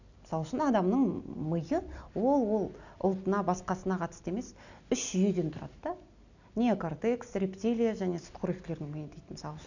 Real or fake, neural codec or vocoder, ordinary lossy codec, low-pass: real; none; none; 7.2 kHz